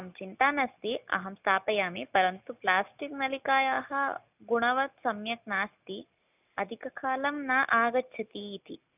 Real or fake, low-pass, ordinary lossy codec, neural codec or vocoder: real; 3.6 kHz; none; none